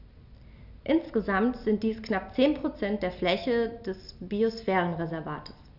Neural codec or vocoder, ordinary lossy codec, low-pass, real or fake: none; none; 5.4 kHz; real